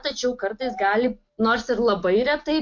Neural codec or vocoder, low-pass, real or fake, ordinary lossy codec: none; 7.2 kHz; real; MP3, 48 kbps